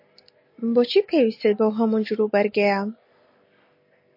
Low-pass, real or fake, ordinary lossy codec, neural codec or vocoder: 5.4 kHz; real; MP3, 32 kbps; none